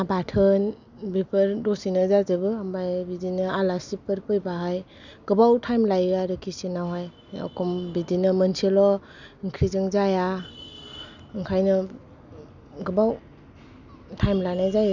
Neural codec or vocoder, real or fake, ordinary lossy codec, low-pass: none; real; none; 7.2 kHz